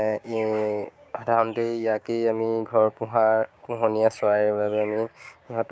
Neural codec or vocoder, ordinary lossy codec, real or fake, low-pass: codec, 16 kHz, 6 kbps, DAC; none; fake; none